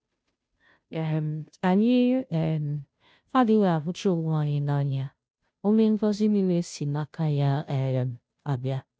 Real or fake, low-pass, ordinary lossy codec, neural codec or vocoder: fake; none; none; codec, 16 kHz, 0.5 kbps, FunCodec, trained on Chinese and English, 25 frames a second